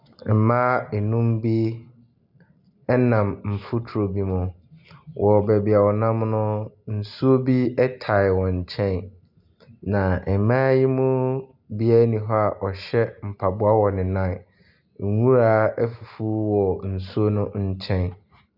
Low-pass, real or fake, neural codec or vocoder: 5.4 kHz; real; none